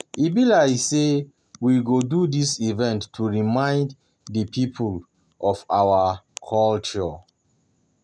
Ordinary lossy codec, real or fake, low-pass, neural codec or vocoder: none; real; none; none